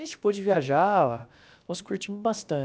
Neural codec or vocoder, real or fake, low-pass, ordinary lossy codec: codec, 16 kHz, about 1 kbps, DyCAST, with the encoder's durations; fake; none; none